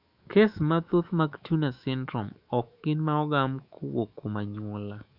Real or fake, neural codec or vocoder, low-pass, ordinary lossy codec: fake; autoencoder, 48 kHz, 128 numbers a frame, DAC-VAE, trained on Japanese speech; 5.4 kHz; none